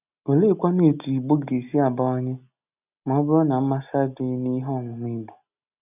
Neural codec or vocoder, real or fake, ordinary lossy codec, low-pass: none; real; none; 3.6 kHz